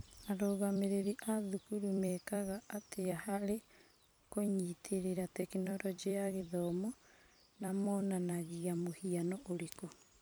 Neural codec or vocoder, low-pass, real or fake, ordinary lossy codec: vocoder, 44.1 kHz, 128 mel bands every 256 samples, BigVGAN v2; none; fake; none